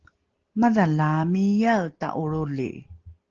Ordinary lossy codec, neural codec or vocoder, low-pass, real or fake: Opus, 16 kbps; none; 7.2 kHz; real